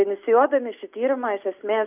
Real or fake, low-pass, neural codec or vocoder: real; 3.6 kHz; none